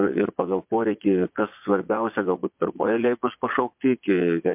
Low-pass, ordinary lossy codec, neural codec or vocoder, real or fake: 3.6 kHz; MP3, 32 kbps; vocoder, 22.05 kHz, 80 mel bands, Vocos; fake